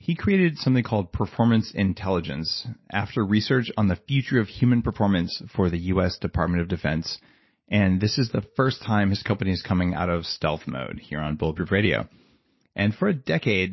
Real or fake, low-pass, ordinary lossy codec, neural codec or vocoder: real; 7.2 kHz; MP3, 24 kbps; none